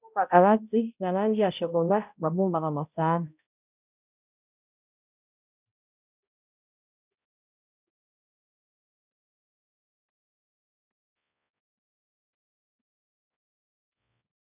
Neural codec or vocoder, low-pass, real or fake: codec, 16 kHz, 0.5 kbps, X-Codec, HuBERT features, trained on balanced general audio; 3.6 kHz; fake